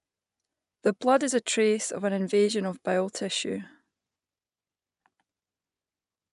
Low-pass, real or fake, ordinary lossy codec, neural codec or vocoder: 10.8 kHz; fake; none; vocoder, 24 kHz, 100 mel bands, Vocos